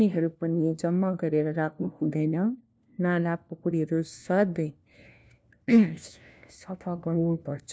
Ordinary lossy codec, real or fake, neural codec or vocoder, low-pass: none; fake; codec, 16 kHz, 1 kbps, FunCodec, trained on LibriTTS, 50 frames a second; none